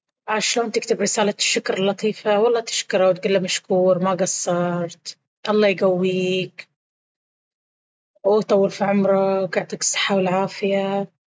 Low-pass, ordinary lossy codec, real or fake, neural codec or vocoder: none; none; real; none